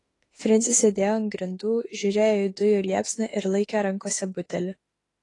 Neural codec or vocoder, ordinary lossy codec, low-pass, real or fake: autoencoder, 48 kHz, 32 numbers a frame, DAC-VAE, trained on Japanese speech; AAC, 32 kbps; 10.8 kHz; fake